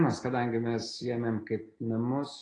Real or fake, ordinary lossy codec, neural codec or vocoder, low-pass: real; AAC, 32 kbps; none; 9.9 kHz